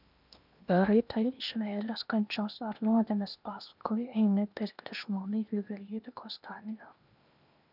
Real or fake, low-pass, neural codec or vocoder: fake; 5.4 kHz; codec, 16 kHz in and 24 kHz out, 0.8 kbps, FocalCodec, streaming, 65536 codes